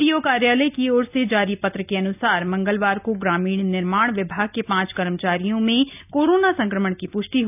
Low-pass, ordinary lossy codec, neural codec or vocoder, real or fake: 3.6 kHz; none; none; real